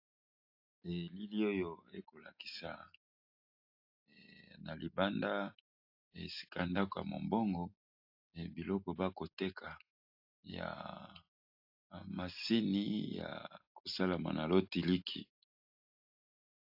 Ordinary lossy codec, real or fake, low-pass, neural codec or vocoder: AAC, 48 kbps; real; 5.4 kHz; none